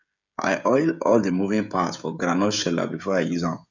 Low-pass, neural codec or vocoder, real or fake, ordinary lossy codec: 7.2 kHz; codec, 16 kHz, 16 kbps, FreqCodec, smaller model; fake; none